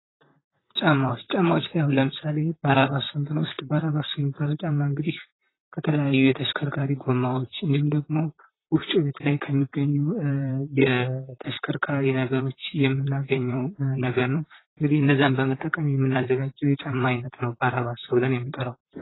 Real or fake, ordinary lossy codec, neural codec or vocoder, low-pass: fake; AAC, 16 kbps; vocoder, 44.1 kHz, 128 mel bands, Pupu-Vocoder; 7.2 kHz